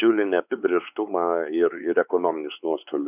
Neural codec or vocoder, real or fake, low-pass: codec, 16 kHz, 4 kbps, X-Codec, WavLM features, trained on Multilingual LibriSpeech; fake; 3.6 kHz